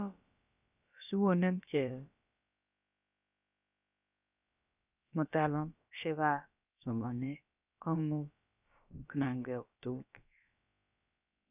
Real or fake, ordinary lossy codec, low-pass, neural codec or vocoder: fake; none; 3.6 kHz; codec, 16 kHz, about 1 kbps, DyCAST, with the encoder's durations